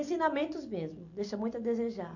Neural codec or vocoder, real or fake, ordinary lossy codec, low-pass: none; real; none; 7.2 kHz